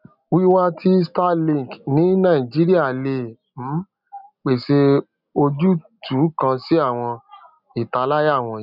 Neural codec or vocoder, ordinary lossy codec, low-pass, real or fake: none; none; 5.4 kHz; real